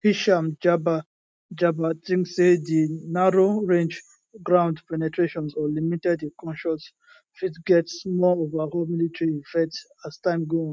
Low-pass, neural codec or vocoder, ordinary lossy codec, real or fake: none; none; none; real